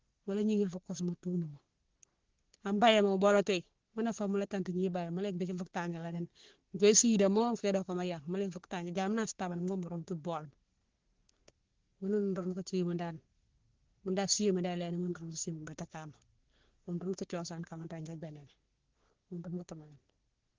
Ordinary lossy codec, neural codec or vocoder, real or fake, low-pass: Opus, 16 kbps; codec, 44.1 kHz, 3.4 kbps, Pupu-Codec; fake; 7.2 kHz